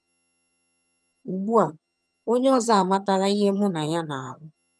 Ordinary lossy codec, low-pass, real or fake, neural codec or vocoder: none; none; fake; vocoder, 22.05 kHz, 80 mel bands, HiFi-GAN